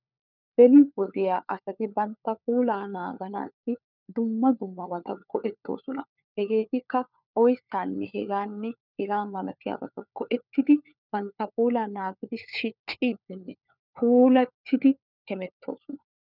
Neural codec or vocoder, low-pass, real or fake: codec, 16 kHz, 4 kbps, FunCodec, trained on LibriTTS, 50 frames a second; 5.4 kHz; fake